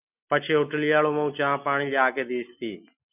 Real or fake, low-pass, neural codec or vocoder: real; 3.6 kHz; none